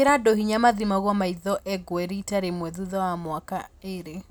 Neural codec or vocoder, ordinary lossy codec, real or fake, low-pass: none; none; real; none